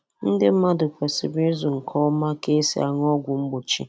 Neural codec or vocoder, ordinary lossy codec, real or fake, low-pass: none; none; real; none